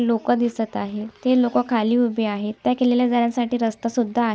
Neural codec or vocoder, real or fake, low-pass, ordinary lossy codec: none; real; none; none